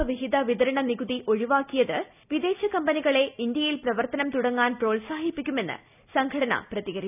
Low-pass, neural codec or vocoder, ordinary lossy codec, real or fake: 3.6 kHz; none; none; real